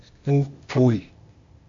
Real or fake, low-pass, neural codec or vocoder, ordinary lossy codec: fake; 7.2 kHz; codec, 16 kHz, 0.8 kbps, ZipCodec; MP3, 48 kbps